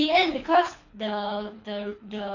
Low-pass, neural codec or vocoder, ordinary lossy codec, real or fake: 7.2 kHz; codec, 24 kHz, 3 kbps, HILCodec; AAC, 48 kbps; fake